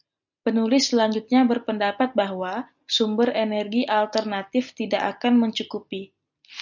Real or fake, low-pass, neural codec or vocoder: real; 7.2 kHz; none